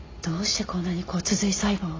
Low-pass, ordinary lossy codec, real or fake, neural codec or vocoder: 7.2 kHz; AAC, 32 kbps; real; none